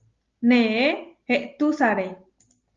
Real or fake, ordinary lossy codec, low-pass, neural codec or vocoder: real; Opus, 32 kbps; 7.2 kHz; none